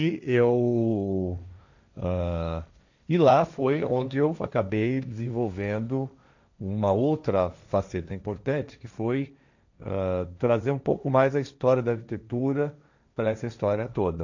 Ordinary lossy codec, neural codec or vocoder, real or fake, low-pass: none; codec, 16 kHz, 1.1 kbps, Voila-Tokenizer; fake; 7.2 kHz